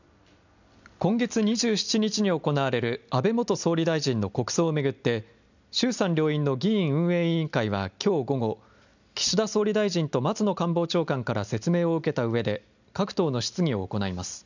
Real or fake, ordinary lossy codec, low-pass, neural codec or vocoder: real; none; 7.2 kHz; none